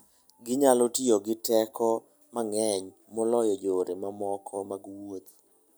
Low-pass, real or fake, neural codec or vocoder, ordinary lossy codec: none; real; none; none